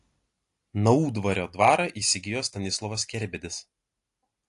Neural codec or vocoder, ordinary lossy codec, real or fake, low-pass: none; AAC, 48 kbps; real; 10.8 kHz